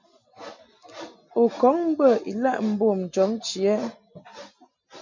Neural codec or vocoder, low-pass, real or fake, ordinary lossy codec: none; 7.2 kHz; real; MP3, 48 kbps